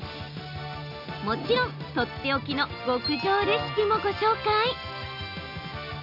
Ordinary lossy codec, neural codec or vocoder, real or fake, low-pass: none; none; real; 5.4 kHz